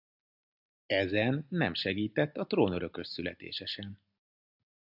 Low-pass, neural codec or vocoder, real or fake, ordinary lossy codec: 5.4 kHz; none; real; AAC, 48 kbps